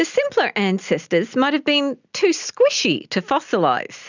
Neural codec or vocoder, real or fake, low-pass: none; real; 7.2 kHz